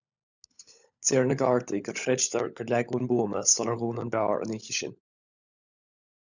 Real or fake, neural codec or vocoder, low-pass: fake; codec, 16 kHz, 16 kbps, FunCodec, trained on LibriTTS, 50 frames a second; 7.2 kHz